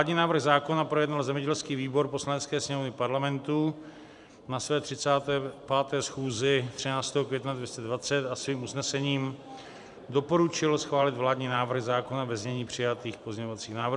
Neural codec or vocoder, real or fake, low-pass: vocoder, 44.1 kHz, 128 mel bands every 512 samples, BigVGAN v2; fake; 10.8 kHz